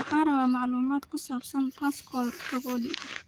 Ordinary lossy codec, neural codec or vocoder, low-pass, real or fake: Opus, 32 kbps; codec, 44.1 kHz, 7.8 kbps, DAC; 19.8 kHz; fake